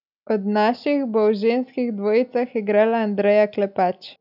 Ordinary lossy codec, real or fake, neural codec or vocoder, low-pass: none; real; none; 5.4 kHz